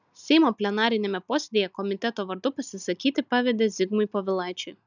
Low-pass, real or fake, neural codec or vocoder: 7.2 kHz; real; none